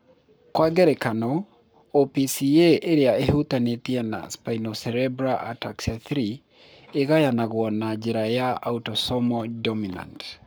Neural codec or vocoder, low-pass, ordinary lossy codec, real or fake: codec, 44.1 kHz, 7.8 kbps, Pupu-Codec; none; none; fake